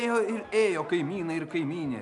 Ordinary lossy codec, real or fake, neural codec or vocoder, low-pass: MP3, 96 kbps; real; none; 10.8 kHz